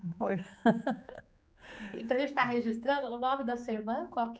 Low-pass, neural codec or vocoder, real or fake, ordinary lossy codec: none; codec, 16 kHz, 4 kbps, X-Codec, HuBERT features, trained on general audio; fake; none